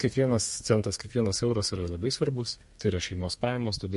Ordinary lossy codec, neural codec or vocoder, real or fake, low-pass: MP3, 48 kbps; codec, 32 kHz, 1.9 kbps, SNAC; fake; 14.4 kHz